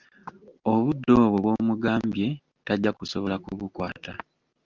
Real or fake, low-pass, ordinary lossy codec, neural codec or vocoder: real; 7.2 kHz; Opus, 32 kbps; none